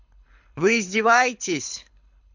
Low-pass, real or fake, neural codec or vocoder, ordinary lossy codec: 7.2 kHz; fake; codec, 24 kHz, 6 kbps, HILCodec; none